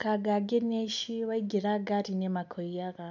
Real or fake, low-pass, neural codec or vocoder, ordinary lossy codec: real; 7.2 kHz; none; none